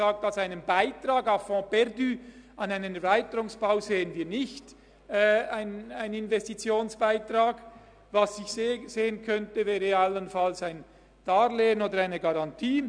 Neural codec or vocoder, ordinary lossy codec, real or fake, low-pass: none; MP3, 96 kbps; real; 9.9 kHz